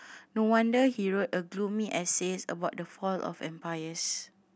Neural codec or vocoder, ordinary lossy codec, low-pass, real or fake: none; none; none; real